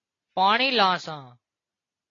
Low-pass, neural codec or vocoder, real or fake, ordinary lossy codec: 7.2 kHz; none; real; AAC, 32 kbps